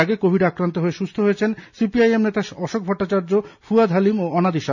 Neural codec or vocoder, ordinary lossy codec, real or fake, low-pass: none; none; real; 7.2 kHz